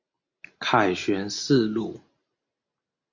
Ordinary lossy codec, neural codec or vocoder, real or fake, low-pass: Opus, 64 kbps; none; real; 7.2 kHz